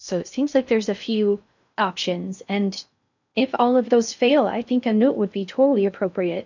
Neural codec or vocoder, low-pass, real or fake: codec, 16 kHz in and 24 kHz out, 0.6 kbps, FocalCodec, streaming, 2048 codes; 7.2 kHz; fake